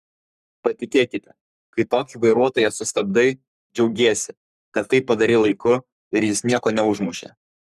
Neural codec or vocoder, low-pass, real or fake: codec, 44.1 kHz, 3.4 kbps, Pupu-Codec; 14.4 kHz; fake